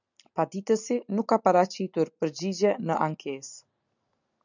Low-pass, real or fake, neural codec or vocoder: 7.2 kHz; real; none